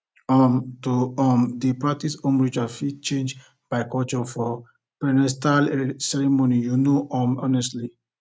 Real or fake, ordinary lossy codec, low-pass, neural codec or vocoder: real; none; none; none